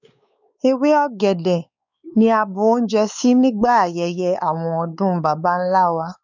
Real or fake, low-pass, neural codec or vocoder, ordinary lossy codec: fake; 7.2 kHz; codec, 16 kHz, 4 kbps, X-Codec, WavLM features, trained on Multilingual LibriSpeech; none